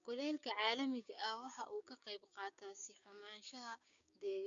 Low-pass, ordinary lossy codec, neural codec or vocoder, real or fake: 7.2 kHz; MP3, 64 kbps; codec, 16 kHz, 6 kbps, DAC; fake